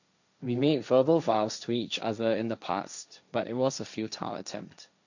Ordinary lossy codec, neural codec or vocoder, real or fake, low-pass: none; codec, 16 kHz, 1.1 kbps, Voila-Tokenizer; fake; 7.2 kHz